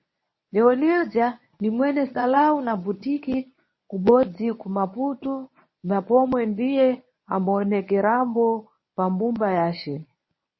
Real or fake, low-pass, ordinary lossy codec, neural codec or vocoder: fake; 7.2 kHz; MP3, 24 kbps; codec, 24 kHz, 0.9 kbps, WavTokenizer, medium speech release version 1